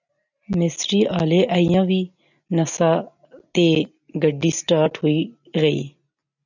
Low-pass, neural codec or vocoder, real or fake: 7.2 kHz; none; real